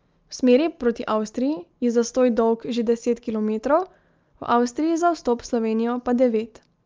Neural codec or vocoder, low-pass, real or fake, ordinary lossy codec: none; 7.2 kHz; real; Opus, 24 kbps